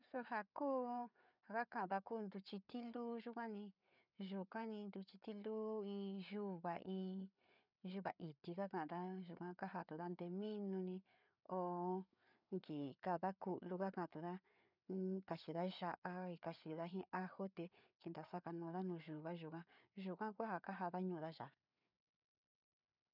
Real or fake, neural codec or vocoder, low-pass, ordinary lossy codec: fake; codec, 16 kHz, 4 kbps, FreqCodec, larger model; 5.4 kHz; none